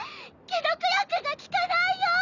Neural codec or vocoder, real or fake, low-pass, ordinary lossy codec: none; real; 7.2 kHz; none